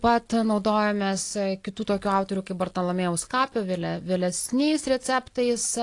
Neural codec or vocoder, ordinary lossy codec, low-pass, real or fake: none; AAC, 48 kbps; 10.8 kHz; real